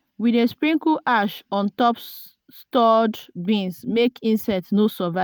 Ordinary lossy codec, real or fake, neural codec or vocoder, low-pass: none; real; none; none